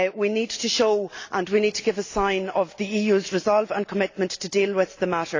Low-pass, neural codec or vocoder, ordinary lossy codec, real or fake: 7.2 kHz; none; AAC, 48 kbps; real